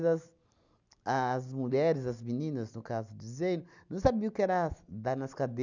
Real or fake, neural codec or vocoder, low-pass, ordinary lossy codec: real; none; 7.2 kHz; none